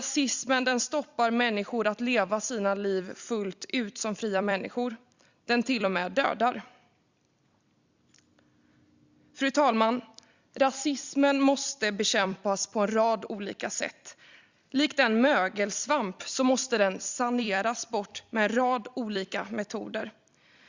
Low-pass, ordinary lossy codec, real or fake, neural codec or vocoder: 7.2 kHz; Opus, 64 kbps; fake; vocoder, 44.1 kHz, 128 mel bands every 256 samples, BigVGAN v2